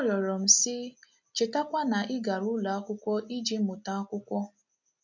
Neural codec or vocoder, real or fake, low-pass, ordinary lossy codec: none; real; 7.2 kHz; none